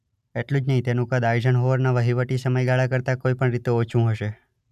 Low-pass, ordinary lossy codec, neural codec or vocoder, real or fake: 14.4 kHz; none; none; real